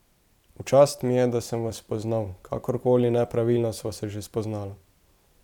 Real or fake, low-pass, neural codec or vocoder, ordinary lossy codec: fake; 19.8 kHz; vocoder, 44.1 kHz, 128 mel bands every 512 samples, BigVGAN v2; none